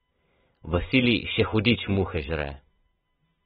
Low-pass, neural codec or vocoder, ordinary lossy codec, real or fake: 7.2 kHz; none; AAC, 16 kbps; real